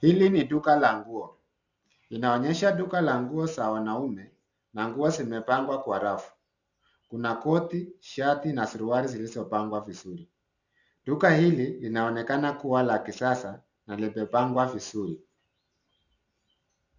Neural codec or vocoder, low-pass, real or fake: none; 7.2 kHz; real